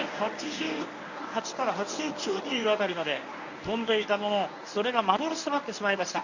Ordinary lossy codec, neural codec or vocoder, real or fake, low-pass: none; codec, 24 kHz, 0.9 kbps, WavTokenizer, medium speech release version 1; fake; 7.2 kHz